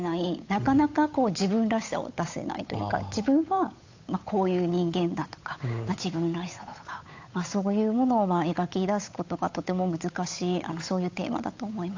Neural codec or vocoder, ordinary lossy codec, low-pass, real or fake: codec, 16 kHz, 8 kbps, FunCodec, trained on Chinese and English, 25 frames a second; AAC, 48 kbps; 7.2 kHz; fake